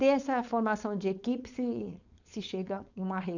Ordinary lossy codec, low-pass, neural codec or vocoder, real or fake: none; 7.2 kHz; codec, 16 kHz, 4.8 kbps, FACodec; fake